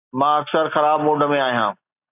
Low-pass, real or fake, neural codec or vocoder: 3.6 kHz; real; none